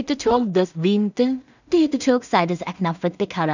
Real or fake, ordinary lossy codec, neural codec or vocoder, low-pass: fake; none; codec, 16 kHz in and 24 kHz out, 0.4 kbps, LongCat-Audio-Codec, two codebook decoder; 7.2 kHz